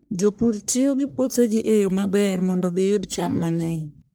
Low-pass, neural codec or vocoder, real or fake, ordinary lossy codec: none; codec, 44.1 kHz, 1.7 kbps, Pupu-Codec; fake; none